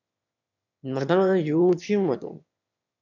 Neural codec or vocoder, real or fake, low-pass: autoencoder, 22.05 kHz, a latent of 192 numbers a frame, VITS, trained on one speaker; fake; 7.2 kHz